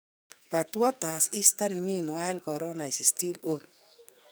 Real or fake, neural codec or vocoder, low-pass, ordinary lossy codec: fake; codec, 44.1 kHz, 2.6 kbps, SNAC; none; none